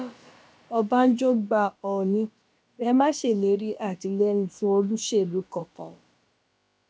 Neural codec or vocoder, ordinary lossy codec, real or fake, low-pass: codec, 16 kHz, about 1 kbps, DyCAST, with the encoder's durations; none; fake; none